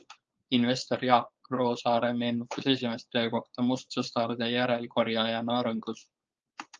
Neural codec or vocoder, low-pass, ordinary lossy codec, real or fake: codec, 16 kHz, 4.8 kbps, FACodec; 7.2 kHz; Opus, 24 kbps; fake